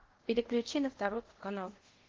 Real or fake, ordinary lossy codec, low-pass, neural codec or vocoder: fake; Opus, 16 kbps; 7.2 kHz; codec, 24 kHz, 0.5 kbps, DualCodec